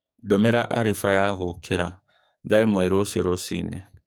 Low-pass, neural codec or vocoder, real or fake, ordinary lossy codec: none; codec, 44.1 kHz, 2.6 kbps, SNAC; fake; none